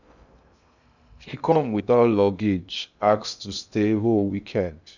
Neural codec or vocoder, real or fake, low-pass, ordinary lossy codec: codec, 16 kHz in and 24 kHz out, 0.6 kbps, FocalCodec, streaming, 2048 codes; fake; 7.2 kHz; none